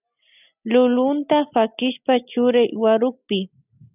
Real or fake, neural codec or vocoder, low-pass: real; none; 3.6 kHz